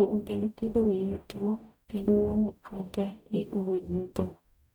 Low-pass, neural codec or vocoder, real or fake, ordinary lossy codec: 19.8 kHz; codec, 44.1 kHz, 0.9 kbps, DAC; fake; none